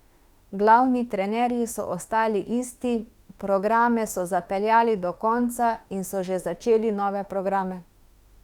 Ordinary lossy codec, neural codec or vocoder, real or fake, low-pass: Opus, 64 kbps; autoencoder, 48 kHz, 32 numbers a frame, DAC-VAE, trained on Japanese speech; fake; 19.8 kHz